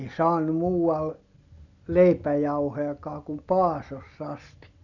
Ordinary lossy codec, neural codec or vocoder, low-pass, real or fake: none; none; 7.2 kHz; real